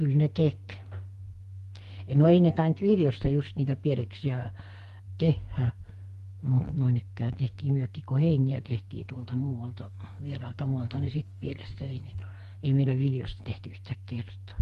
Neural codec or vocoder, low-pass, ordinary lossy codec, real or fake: codec, 44.1 kHz, 2.6 kbps, SNAC; 14.4 kHz; Opus, 24 kbps; fake